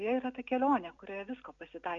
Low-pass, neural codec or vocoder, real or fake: 7.2 kHz; none; real